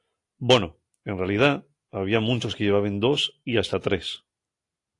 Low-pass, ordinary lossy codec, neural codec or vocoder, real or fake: 10.8 kHz; AAC, 48 kbps; none; real